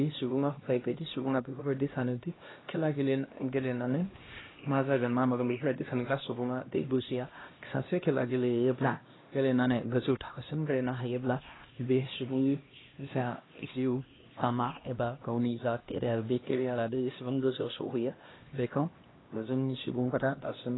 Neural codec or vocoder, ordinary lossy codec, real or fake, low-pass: codec, 16 kHz, 1 kbps, X-Codec, HuBERT features, trained on LibriSpeech; AAC, 16 kbps; fake; 7.2 kHz